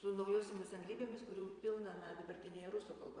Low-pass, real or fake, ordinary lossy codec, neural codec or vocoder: 9.9 kHz; fake; Opus, 64 kbps; vocoder, 22.05 kHz, 80 mel bands, Vocos